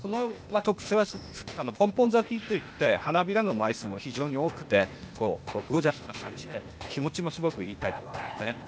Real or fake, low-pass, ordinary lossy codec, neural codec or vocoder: fake; none; none; codec, 16 kHz, 0.8 kbps, ZipCodec